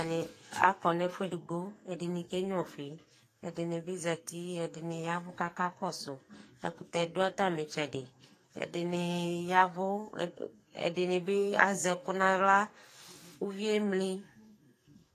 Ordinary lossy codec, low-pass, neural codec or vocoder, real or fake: AAC, 48 kbps; 14.4 kHz; codec, 44.1 kHz, 2.6 kbps, SNAC; fake